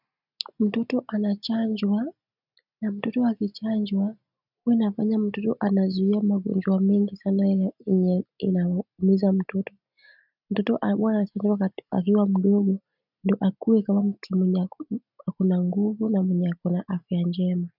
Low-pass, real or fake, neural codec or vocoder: 5.4 kHz; real; none